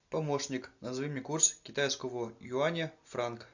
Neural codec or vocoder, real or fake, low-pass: none; real; 7.2 kHz